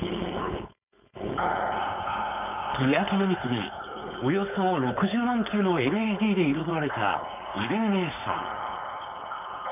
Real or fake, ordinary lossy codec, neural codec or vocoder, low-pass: fake; none; codec, 16 kHz, 4.8 kbps, FACodec; 3.6 kHz